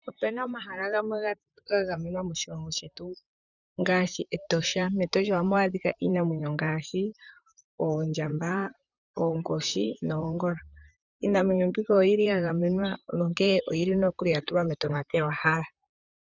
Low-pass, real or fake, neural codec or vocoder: 7.2 kHz; fake; vocoder, 44.1 kHz, 128 mel bands, Pupu-Vocoder